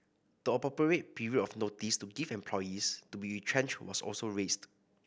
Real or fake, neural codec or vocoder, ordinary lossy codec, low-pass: real; none; none; none